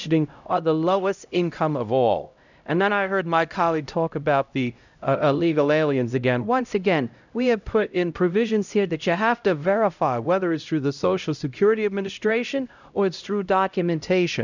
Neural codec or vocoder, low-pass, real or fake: codec, 16 kHz, 0.5 kbps, X-Codec, HuBERT features, trained on LibriSpeech; 7.2 kHz; fake